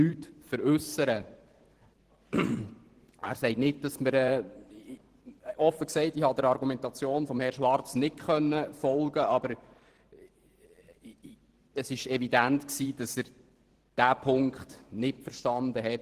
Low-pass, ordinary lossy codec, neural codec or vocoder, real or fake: 14.4 kHz; Opus, 16 kbps; vocoder, 44.1 kHz, 128 mel bands every 512 samples, BigVGAN v2; fake